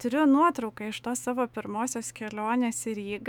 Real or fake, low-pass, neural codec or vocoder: fake; 19.8 kHz; autoencoder, 48 kHz, 128 numbers a frame, DAC-VAE, trained on Japanese speech